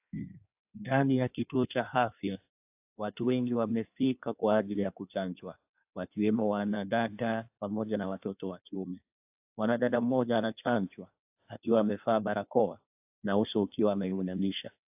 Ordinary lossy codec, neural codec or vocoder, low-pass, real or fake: AAC, 32 kbps; codec, 16 kHz in and 24 kHz out, 1.1 kbps, FireRedTTS-2 codec; 3.6 kHz; fake